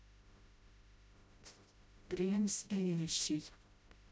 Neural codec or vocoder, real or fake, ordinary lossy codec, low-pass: codec, 16 kHz, 0.5 kbps, FreqCodec, smaller model; fake; none; none